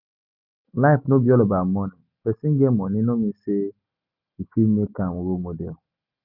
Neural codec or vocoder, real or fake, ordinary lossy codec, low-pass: none; real; none; 5.4 kHz